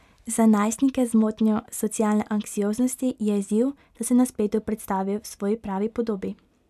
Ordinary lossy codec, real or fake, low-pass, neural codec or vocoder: none; real; 14.4 kHz; none